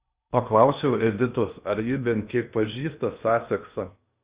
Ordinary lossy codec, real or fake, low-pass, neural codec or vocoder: Opus, 64 kbps; fake; 3.6 kHz; codec, 16 kHz in and 24 kHz out, 0.8 kbps, FocalCodec, streaming, 65536 codes